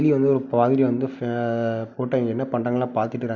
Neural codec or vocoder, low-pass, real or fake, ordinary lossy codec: none; 7.2 kHz; real; none